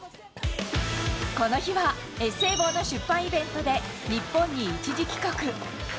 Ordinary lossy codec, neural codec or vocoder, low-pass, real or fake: none; none; none; real